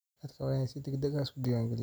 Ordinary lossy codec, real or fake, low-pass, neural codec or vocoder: none; real; none; none